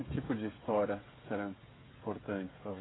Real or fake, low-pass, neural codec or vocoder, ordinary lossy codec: fake; 7.2 kHz; codec, 16 kHz, 8 kbps, FreqCodec, smaller model; AAC, 16 kbps